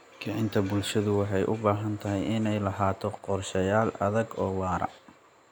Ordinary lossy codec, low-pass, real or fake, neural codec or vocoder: none; none; real; none